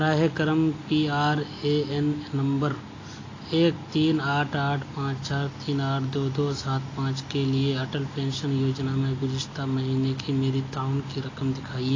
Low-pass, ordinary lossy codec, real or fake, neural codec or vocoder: 7.2 kHz; AAC, 32 kbps; real; none